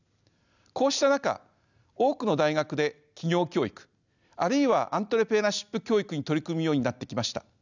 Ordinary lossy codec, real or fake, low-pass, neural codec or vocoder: none; real; 7.2 kHz; none